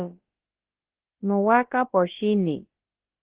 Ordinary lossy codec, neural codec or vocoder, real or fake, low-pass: Opus, 16 kbps; codec, 16 kHz, about 1 kbps, DyCAST, with the encoder's durations; fake; 3.6 kHz